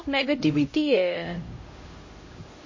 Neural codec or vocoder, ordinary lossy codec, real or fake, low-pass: codec, 16 kHz, 0.5 kbps, X-Codec, HuBERT features, trained on LibriSpeech; MP3, 32 kbps; fake; 7.2 kHz